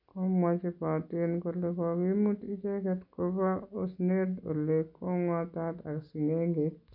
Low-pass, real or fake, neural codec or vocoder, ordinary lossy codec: 5.4 kHz; real; none; none